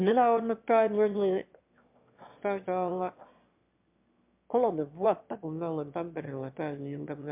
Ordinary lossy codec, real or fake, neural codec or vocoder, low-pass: MP3, 32 kbps; fake; autoencoder, 22.05 kHz, a latent of 192 numbers a frame, VITS, trained on one speaker; 3.6 kHz